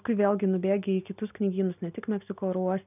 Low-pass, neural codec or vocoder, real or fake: 3.6 kHz; none; real